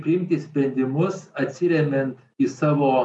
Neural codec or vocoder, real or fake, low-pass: none; real; 10.8 kHz